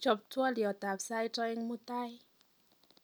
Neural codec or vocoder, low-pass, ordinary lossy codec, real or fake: none; none; none; real